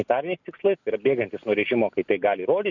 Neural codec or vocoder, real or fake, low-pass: none; real; 7.2 kHz